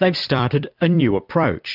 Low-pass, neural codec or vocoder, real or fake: 5.4 kHz; vocoder, 44.1 kHz, 128 mel bands every 256 samples, BigVGAN v2; fake